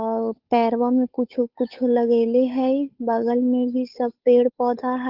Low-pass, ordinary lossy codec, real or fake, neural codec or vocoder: 5.4 kHz; Opus, 32 kbps; fake; codec, 16 kHz, 8 kbps, FunCodec, trained on Chinese and English, 25 frames a second